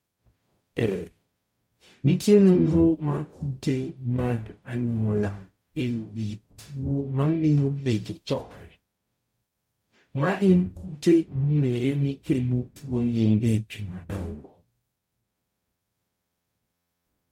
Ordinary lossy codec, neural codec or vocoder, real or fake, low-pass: MP3, 64 kbps; codec, 44.1 kHz, 0.9 kbps, DAC; fake; 19.8 kHz